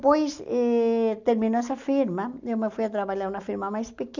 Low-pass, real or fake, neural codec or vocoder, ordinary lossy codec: 7.2 kHz; real; none; none